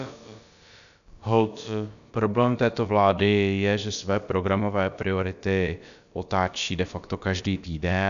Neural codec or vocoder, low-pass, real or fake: codec, 16 kHz, about 1 kbps, DyCAST, with the encoder's durations; 7.2 kHz; fake